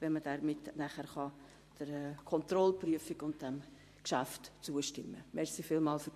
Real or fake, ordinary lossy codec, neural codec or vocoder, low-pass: fake; MP3, 64 kbps; vocoder, 44.1 kHz, 128 mel bands every 512 samples, BigVGAN v2; 14.4 kHz